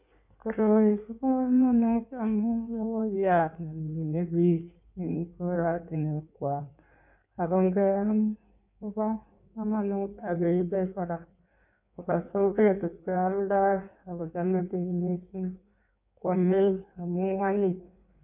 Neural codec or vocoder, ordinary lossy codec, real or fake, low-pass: codec, 16 kHz in and 24 kHz out, 1.1 kbps, FireRedTTS-2 codec; none; fake; 3.6 kHz